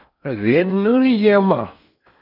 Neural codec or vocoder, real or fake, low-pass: codec, 16 kHz in and 24 kHz out, 0.8 kbps, FocalCodec, streaming, 65536 codes; fake; 5.4 kHz